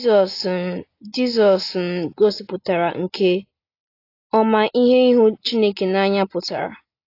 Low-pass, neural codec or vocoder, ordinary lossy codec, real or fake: 5.4 kHz; none; AAC, 32 kbps; real